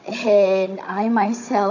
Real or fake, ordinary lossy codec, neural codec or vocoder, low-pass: fake; none; codec, 16 kHz, 16 kbps, FunCodec, trained on Chinese and English, 50 frames a second; 7.2 kHz